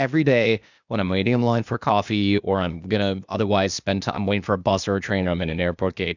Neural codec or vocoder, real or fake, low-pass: codec, 16 kHz, 0.8 kbps, ZipCodec; fake; 7.2 kHz